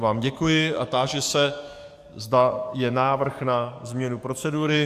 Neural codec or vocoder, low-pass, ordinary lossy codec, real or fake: codec, 44.1 kHz, 7.8 kbps, DAC; 14.4 kHz; AAC, 96 kbps; fake